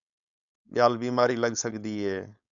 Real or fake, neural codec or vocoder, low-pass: fake; codec, 16 kHz, 4.8 kbps, FACodec; 7.2 kHz